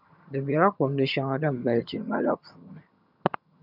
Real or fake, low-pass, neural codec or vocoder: fake; 5.4 kHz; vocoder, 22.05 kHz, 80 mel bands, HiFi-GAN